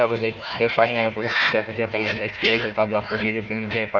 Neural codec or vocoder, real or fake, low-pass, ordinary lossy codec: codec, 16 kHz, 1 kbps, FunCodec, trained on Chinese and English, 50 frames a second; fake; 7.2 kHz; none